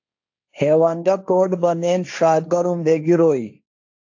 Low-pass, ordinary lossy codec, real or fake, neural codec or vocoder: 7.2 kHz; AAC, 48 kbps; fake; codec, 16 kHz, 1.1 kbps, Voila-Tokenizer